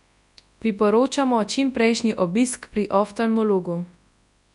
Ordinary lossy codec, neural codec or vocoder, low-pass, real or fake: none; codec, 24 kHz, 0.9 kbps, WavTokenizer, large speech release; 10.8 kHz; fake